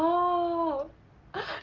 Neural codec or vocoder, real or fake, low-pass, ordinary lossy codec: none; real; 7.2 kHz; Opus, 16 kbps